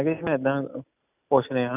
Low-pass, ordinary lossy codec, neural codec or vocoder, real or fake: 3.6 kHz; none; none; real